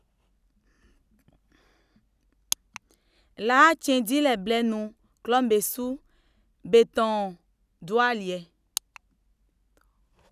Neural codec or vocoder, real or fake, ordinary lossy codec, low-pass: none; real; none; 14.4 kHz